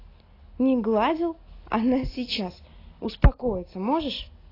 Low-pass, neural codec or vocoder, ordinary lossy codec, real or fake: 5.4 kHz; none; AAC, 24 kbps; real